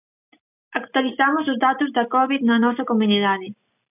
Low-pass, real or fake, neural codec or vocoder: 3.6 kHz; real; none